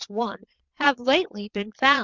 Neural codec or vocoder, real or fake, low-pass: vocoder, 22.05 kHz, 80 mel bands, Vocos; fake; 7.2 kHz